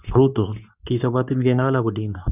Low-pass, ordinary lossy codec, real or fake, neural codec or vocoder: 3.6 kHz; none; fake; codec, 24 kHz, 0.9 kbps, WavTokenizer, medium speech release version 2